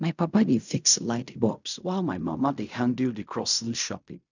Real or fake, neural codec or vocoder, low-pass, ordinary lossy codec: fake; codec, 16 kHz in and 24 kHz out, 0.4 kbps, LongCat-Audio-Codec, fine tuned four codebook decoder; 7.2 kHz; none